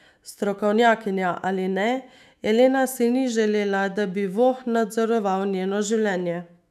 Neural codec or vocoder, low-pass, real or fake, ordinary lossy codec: autoencoder, 48 kHz, 128 numbers a frame, DAC-VAE, trained on Japanese speech; 14.4 kHz; fake; none